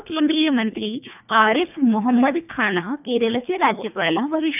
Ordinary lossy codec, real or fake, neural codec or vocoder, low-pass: none; fake; codec, 24 kHz, 1.5 kbps, HILCodec; 3.6 kHz